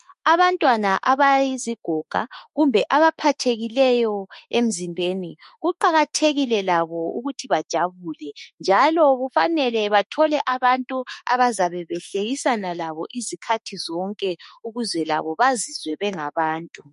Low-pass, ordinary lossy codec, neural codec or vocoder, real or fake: 14.4 kHz; MP3, 48 kbps; autoencoder, 48 kHz, 32 numbers a frame, DAC-VAE, trained on Japanese speech; fake